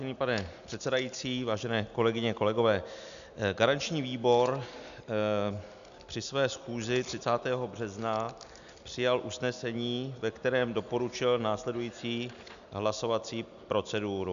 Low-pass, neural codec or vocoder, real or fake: 7.2 kHz; none; real